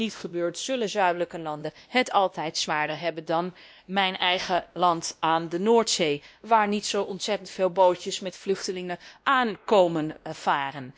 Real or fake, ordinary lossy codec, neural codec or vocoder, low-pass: fake; none; codec, 16 kHz, 1 kbps, X-Codec, WavLM features, trained on Multilingual LibriSpeech; none